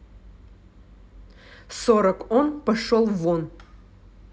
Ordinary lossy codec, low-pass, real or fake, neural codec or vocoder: none; none; real; none